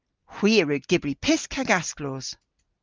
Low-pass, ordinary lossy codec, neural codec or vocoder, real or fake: 7.2 kHz; Opus, 24 kbps; none; real